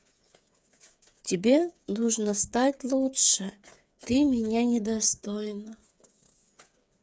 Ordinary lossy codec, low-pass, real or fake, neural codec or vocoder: none; none; fake; codec, 16 kHz, 8 kbps, FreqCodec, smaller model